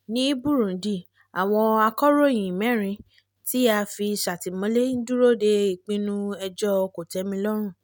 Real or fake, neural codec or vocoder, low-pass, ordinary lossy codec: real; none; none; none